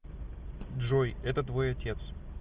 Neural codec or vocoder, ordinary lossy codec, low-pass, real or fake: none; Opus, 24 kbps; 3.6 kHz; real